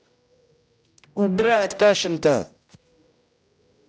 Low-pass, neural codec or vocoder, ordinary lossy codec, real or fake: none; codec, 16 kHz, 0.5 kbps, X-Codec, HuBERT features, trained on general audio; none; fake